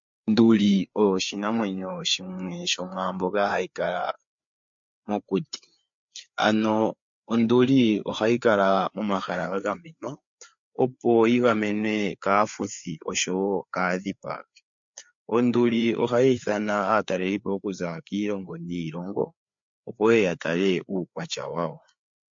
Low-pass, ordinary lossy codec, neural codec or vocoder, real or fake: 7.2 kHz; MP3, 48 kbps; codec, 16 kHz, 4 kbps, FreqCodec, larger model; fake